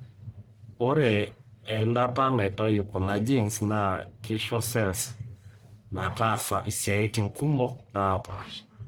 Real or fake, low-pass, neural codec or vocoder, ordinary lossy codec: fake; none; codec, 44.1 kHz, 1.7 kbps, Pupu-Codec; none